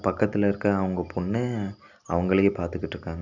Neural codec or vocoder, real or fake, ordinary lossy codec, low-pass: none; real; none; 7.2 kHz